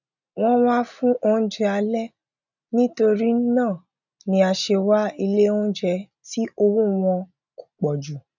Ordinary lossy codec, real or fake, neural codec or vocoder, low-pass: none; real; none; 7.2 kHz